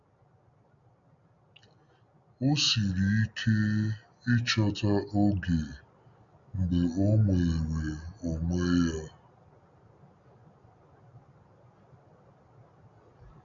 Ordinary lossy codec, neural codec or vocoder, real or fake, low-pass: none; none; real; 7.2 kHz